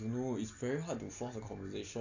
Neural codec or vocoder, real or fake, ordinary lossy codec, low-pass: none; real; AAC, 48 kbps; 7.2 kHz